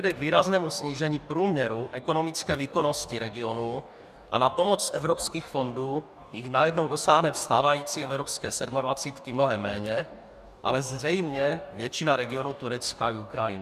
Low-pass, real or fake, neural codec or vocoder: 14.4 kHz; fake; codec, 44.1 kHz, 2.6 kbps, DAC